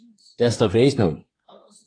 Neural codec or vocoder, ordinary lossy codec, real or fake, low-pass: codec, 24 kHz, 1 kbps, SNAC; AAC, 32 kbps; fake; 9.9 kHz